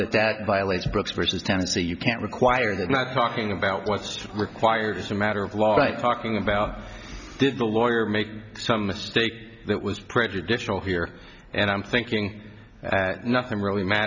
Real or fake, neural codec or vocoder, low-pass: real; none; 7.2 kHz